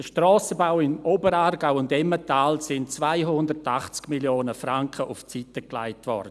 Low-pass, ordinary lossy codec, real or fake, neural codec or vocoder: none; none; real; none